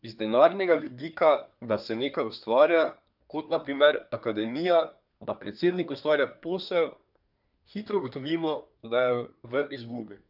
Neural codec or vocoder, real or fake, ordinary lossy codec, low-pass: codec, 24 kHz, 1 kbps, SNAC; fake; none; 5.4 kHz